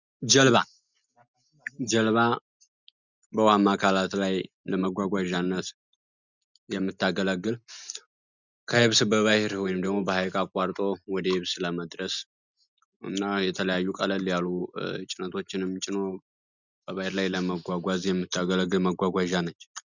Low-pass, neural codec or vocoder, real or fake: 7.2 kHz; none; real